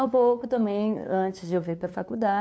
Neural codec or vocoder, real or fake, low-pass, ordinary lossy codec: codec, 16 kHz, 2 kbps, FunCodec, trained on LibriTTS, 25 frames a second; fake; none; none